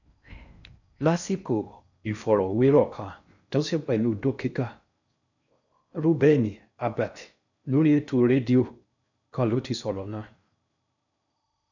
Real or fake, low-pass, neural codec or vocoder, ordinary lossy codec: fake; 7.2 kHz; codec, 16 kHz in and 24 kHz out, 0.6 kbps, FocalCodec, streaming, 4096 codes; none